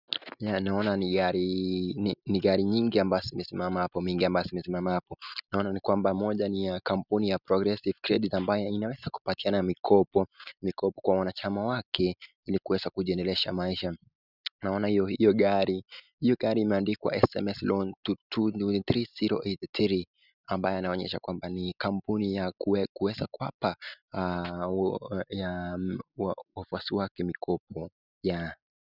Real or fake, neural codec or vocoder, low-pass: real; none; 5.4 kHz